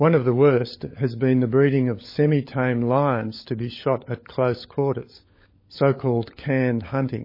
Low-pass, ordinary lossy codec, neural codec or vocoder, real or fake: 5.4 kHz; MP3, 24 kbps; codec, 16 kHz, 16 kbps, FunCodec, trained on LibriTTS, 50 frames a second; fake